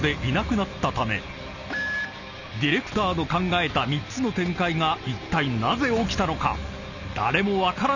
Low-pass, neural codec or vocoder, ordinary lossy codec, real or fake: 7.2 kHz; none; none; real